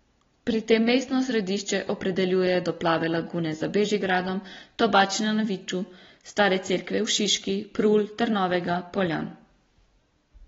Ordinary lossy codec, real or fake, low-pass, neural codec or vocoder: AAC, 24 kbps; real; 7.2 kHz; none